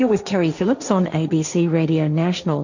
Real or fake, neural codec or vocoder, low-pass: fake; codec, 16 kHz, 1.1 kbps, Voila-Tokenizer; 7.2 kHz